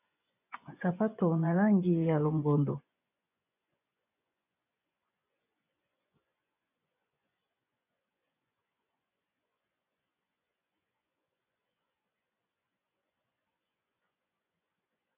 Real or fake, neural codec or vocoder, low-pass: fake; vocoder, 24 kHz, 100 mel bands, Vocos; 3.6 kHz